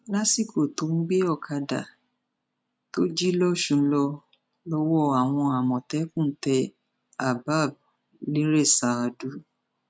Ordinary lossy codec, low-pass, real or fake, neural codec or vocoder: none; none; real; none